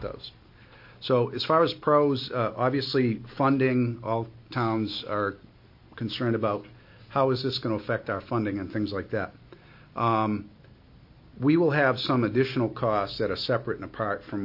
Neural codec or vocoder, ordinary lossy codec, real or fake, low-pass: none; MP3, 32 kbps; real; 5.4 kHz